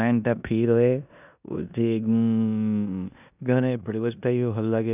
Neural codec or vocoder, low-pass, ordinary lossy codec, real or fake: codec, 16 kHz in and 24 kHz out, 0.9 kbps, LongCat-Audio-Codec, four codebook decoder; 3.6 kHz; none; fake